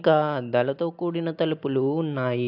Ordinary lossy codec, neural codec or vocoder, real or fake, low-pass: AAC, 48 kbps; none; real; 5.4 kHz